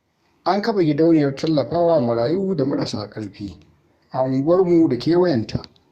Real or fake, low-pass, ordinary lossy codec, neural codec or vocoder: fake; 14.4 kHz; Opus, 64 kbps; codec, 32 kHz, 1.9 kbps, SNAC